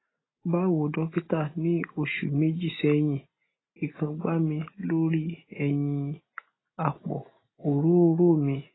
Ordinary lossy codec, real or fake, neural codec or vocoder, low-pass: AAC, 16 kbps; real; none; 7.2 kHz